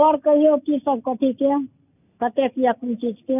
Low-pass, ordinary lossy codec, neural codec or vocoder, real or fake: 3.6 kHz; none; none; real